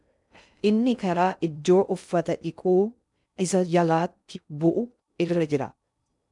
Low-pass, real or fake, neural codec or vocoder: 10.8 kHz; fake; codec, 16 kHz in and 24 kHz out, 0.6 kbps, FocalCodec, streaming, 2048 codes